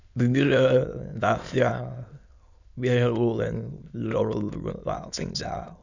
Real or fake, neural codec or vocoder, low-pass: fake; autoencoder, 22.05 kHz, a latent of 192 numbers a frame, VITS, trained on many speakers; 7.2 kHz